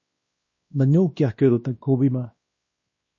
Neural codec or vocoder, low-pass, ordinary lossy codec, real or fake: codec, 16 kHz, 1 kbps, X-Codec, WavLM features, trained on Multilingual LibriSpeech; 7.2 kHz; MP3, 32 kbps; fake